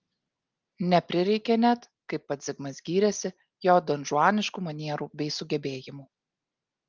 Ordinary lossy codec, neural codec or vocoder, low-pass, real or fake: Opus, 24 kbps; none; 7.2 kHz; real